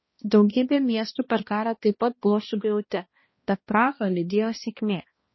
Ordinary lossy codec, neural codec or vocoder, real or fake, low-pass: MP3, 24 kbps; codec, 16 kHz, 1 kbps, X-Codec, HuBERT features, trained on balanced general audio; fake; 7.2 kHz